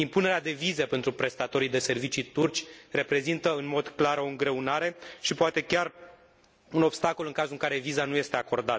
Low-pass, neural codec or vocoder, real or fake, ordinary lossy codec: none; none; real; none